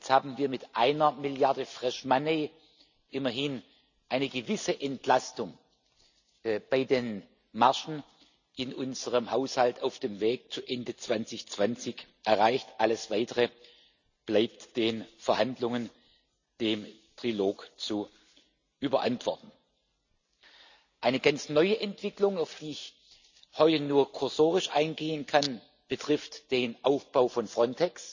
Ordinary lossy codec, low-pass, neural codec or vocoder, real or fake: none; 7.2 kHz; none; real